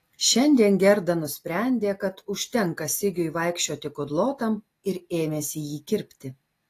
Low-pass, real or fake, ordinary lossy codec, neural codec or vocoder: 14.4 kHz; real; AAC, 48 kbps; none